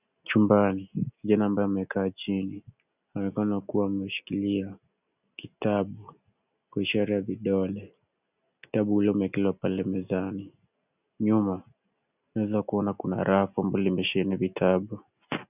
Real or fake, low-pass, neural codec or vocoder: real; 3.6 kHz; none